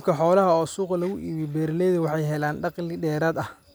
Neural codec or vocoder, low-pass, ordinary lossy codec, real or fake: none; none; none; real